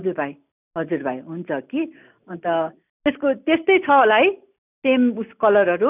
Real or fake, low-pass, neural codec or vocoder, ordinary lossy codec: real; 3.6 kHz; none; none